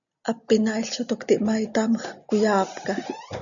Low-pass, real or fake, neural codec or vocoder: 7.2 kHz; real; none